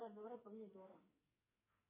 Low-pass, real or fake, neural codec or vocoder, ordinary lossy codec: 3.6 kHz; fake; codec, 44.1 kHz, 2.6 kbps, SNAC; MP3, 16 kbps